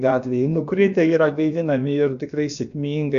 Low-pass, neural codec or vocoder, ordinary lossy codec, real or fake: 7.2 kHz; codec, 16 kHz, about 1 kbps, DyCAST, with the encoder's durations; MP3, 96 kbps; fake